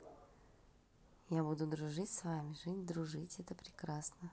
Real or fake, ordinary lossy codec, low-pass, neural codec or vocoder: real; none; none; none